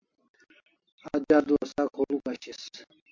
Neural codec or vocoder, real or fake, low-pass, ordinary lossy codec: none; real; 7.2 kHz; MP3, 48 kbps